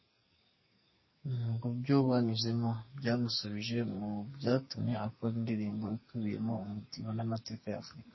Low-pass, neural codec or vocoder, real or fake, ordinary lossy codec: 7.2 kHz; codec, 44.1 kHz, 2.6 kbps, SNAC; fake; MP3, 24 kbps